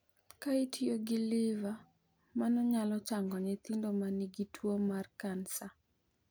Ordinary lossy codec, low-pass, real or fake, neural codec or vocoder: none; none; real; none